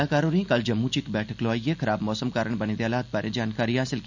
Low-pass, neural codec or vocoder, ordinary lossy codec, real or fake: 7.2 kHz; none; none; real